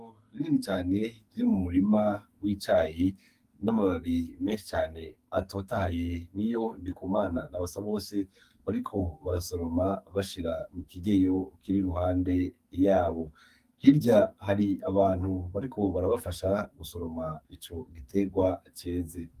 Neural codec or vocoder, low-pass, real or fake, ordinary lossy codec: codec, 44.1 kHz, 2.6 kbps, SNAC; 14.4 kHz; fake; Opus, 32 kbps